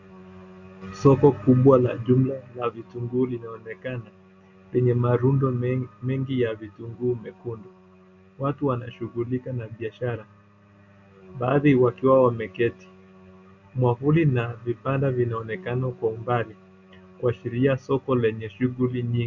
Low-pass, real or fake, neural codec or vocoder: 7.2 kHz; real; none